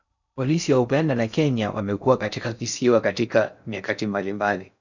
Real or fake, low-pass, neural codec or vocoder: fake; 7.2 kHz; codec, 16 kHz in and 24 kHz out, 0.6 kbps, FocalCodec, streaming, 4096 codes